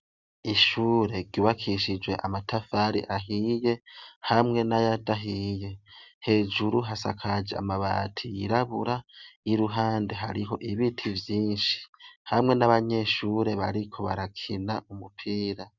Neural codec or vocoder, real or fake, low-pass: none; real; 7.2 kHz